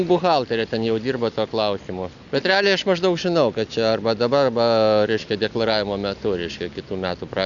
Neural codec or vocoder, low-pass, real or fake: none; 7.2 kHz; real